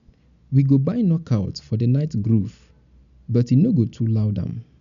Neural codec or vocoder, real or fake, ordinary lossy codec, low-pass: none; real; none; 7.2 kHz